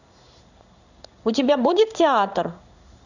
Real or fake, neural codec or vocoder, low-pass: fake; codec, 16 kHz in and 24 kHz out, 1 kbps, XY-Tokenizer; 7.2 kHz